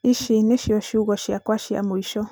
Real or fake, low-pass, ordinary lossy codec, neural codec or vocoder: real; none; none; none